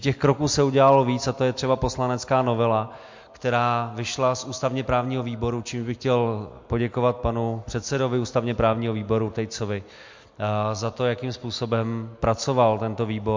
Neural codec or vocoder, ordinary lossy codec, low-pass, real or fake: none; MP3, 48 kbps; 7.2 kHz; real